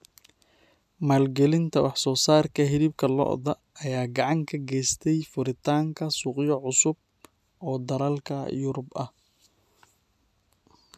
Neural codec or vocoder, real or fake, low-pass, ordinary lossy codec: none; real; 14.4 kHz; none